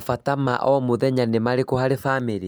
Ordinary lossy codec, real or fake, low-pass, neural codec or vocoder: none; real; none; none